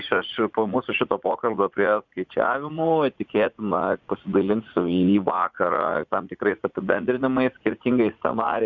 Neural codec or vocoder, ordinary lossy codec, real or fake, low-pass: vocoder, 44.1 kHz, 80 mel bands, Vocos; Opus, 64 kbps; fake; 7.2 kHz